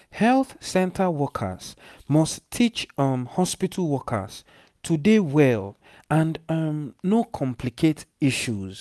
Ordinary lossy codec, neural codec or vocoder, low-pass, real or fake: none; none; none; real